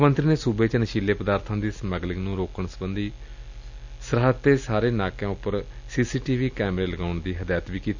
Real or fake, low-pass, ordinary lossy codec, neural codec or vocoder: real; 7.2 kHz; none; none